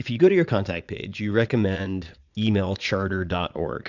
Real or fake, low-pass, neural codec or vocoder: fake; 7.2 kHz; vocoder, 22.05 kHz, 80 mel bands, Vocos